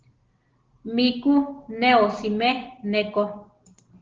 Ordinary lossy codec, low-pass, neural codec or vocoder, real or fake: Opus, 16 kbps; 7.2 kHz; none; real